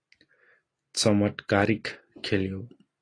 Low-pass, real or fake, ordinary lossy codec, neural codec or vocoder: 9.9 kHz; real; AAC, 32 kbps; none